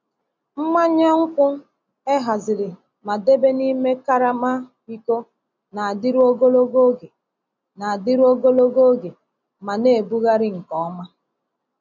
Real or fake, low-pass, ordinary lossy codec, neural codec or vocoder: real; 7.2 kHz; none; none